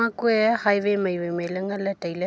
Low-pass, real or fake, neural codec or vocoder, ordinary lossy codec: none; real; none; none